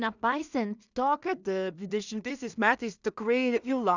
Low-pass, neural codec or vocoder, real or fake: 7.2 kHz; codec, 16 kHz in and 24 kHz out, 0.4 kbps, LongCat-Audio-Codec, two codebook decoder; fake